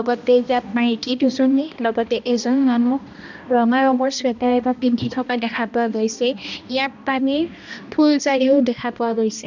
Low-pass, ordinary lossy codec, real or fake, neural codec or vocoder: 7.2 kHz; none; fake; codec, 16 kHz, 1 kbps, X-Codec, HuBERT features, trained on balanced general audio